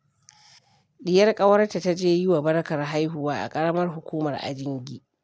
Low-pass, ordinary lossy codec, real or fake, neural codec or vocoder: none; none; real; none